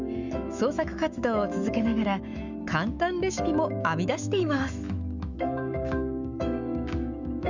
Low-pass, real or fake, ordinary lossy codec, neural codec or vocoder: 7.2 kHz; fake; none; autoencoder, 48 kHz, 128 numbers a frame, DAC-VAE, trained on Japanese speech